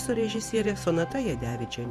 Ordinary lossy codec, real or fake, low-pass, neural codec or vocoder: Opus, 64 kbps; fake; 14.4 kHz; vocoder, 48 kHz, 128 mel bands, Vocos